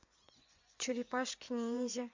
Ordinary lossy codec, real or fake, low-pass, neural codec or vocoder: MP3, 64 kbps; fake; 7.2 kHz; vocoder, 24 kHz, 100 mel bands, Vocos